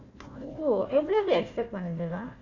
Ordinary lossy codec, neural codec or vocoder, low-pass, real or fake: none; codec, 16 kHz, 1 kbps, FunCodec, trained on Chinese and English, 50 frames a second; 7.2 kHz; fake